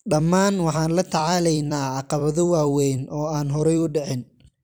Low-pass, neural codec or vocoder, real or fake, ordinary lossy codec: none; vocoder, 44.1 kHz, 128 mel bands every 256 samples, BigVGAN v2; fake; none